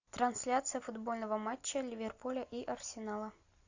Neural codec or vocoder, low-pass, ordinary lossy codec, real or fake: none; 7.2 kHz; AAC, 48 kbps; real